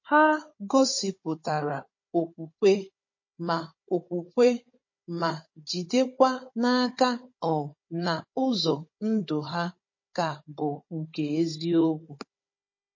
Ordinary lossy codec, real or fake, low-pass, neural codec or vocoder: MP3, 32 kbps; fake; 7.2 kHz; codec, 16 kHz, 16 kbps, FunCodec, trained on Chinese and English, 50 frames a second